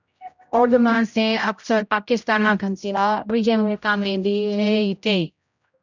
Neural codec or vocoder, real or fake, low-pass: codec, 16 kHz, 0.5 kbps, X-Codec, HuBERT features, trained on general audio; fake; 7.2 kHz